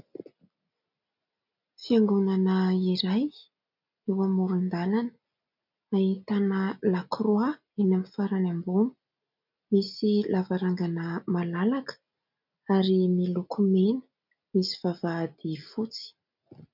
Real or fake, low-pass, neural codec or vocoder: real; 5.4 kHz; none